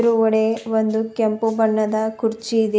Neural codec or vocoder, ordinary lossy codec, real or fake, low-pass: none; none; real; none